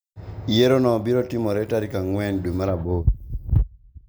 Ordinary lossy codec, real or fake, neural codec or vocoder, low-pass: none; real; none; none